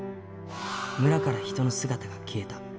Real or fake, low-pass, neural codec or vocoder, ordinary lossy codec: real; none; none; none